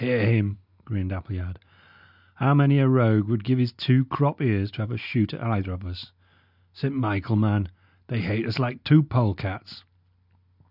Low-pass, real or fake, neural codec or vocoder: 5.4 kHz; real; none